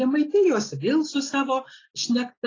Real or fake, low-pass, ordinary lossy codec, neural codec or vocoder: real; 7.2 kHz; AAC, 32 kbps; none